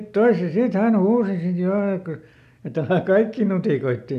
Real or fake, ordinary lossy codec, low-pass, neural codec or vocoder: real; none; 14.4 kHz; none